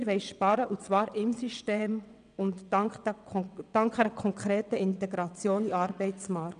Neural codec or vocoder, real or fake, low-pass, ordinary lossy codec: vocoder, 22.05 kHz, 80 mel bands, WaveNeXt; fake; 9.9 kHz; none